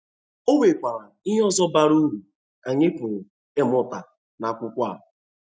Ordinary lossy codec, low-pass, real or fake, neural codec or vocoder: none; none; real; none